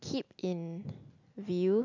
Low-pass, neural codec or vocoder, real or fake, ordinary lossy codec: 7.2 kHz; none; real; none